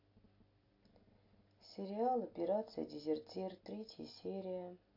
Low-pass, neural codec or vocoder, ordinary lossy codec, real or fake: 5.4 kHz; none; AAC, 32 kbps; real